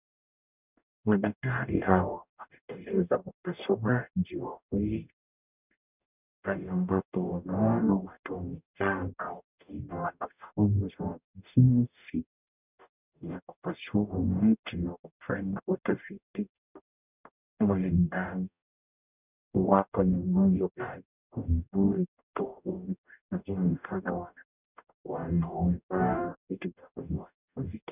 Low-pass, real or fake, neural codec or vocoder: 3.6 kHz; fake; codec, 44.1 kHz, 0.9 kbps, DAC